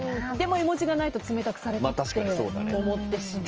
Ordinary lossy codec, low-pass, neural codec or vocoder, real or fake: Opus, 24 kbps; 7.2 kHz; none; real